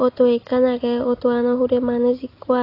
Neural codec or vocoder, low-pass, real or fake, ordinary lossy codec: none; 5.4 kHz; real; AAC, 24 kbps